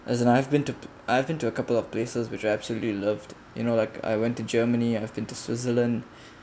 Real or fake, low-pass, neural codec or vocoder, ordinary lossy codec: real; none; none; none